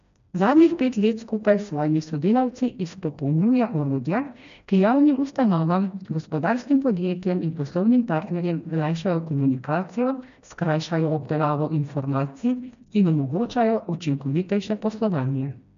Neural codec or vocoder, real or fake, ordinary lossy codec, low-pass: codec, 16 kHz, 1 kbps, FreqCodec, smaller model; fake; MP3, 64 kbps; 7.2 kHz